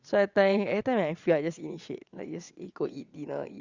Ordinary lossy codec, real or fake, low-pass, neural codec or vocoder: Opus, 64 kbps; fake; 7.2 kHz; vocoder, 22.05 kHz, 80 mel bands, WaveNeXt